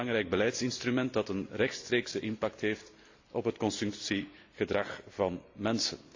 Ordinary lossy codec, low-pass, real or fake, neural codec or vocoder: AAC, 48 kbps; 7.2 kHz; real; none